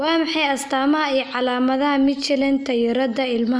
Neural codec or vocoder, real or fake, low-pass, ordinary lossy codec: none; real; none; none